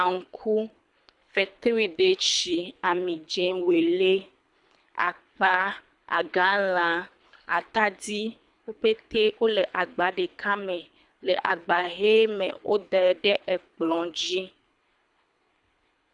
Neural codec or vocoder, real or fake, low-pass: codec, 24 kHz, 3 kbps, HILCodec; fake; 10.8 kHz